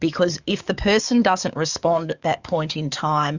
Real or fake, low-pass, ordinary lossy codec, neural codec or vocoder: fake; 7.2 kHz; Opus, 64 kbps; codec, 44.1 kHz, 7.8 kbps, DAC